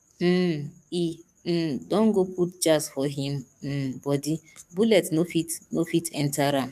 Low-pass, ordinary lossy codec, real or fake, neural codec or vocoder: 14.4 kHz; MP3, 96 kbps; fake; codec, 44.1 kHz, 7.8 kbps, DAC